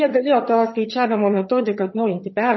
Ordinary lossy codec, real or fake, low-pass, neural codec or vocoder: MP3, 24 kbps; fake; 7.2 kHz; vocoder, 22.05 kHz, 80 mel bands, HiFi-GAN